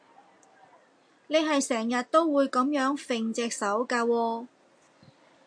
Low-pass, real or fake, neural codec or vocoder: 9.9 kHz; real; none